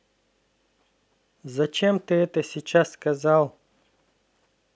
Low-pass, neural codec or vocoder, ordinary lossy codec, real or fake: none; none; none; real